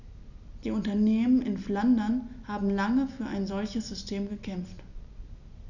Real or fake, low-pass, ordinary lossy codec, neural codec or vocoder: real; 7.2 kHz; none; none